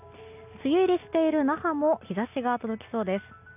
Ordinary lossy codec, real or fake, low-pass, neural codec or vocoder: none; real; 3.6 kHz; none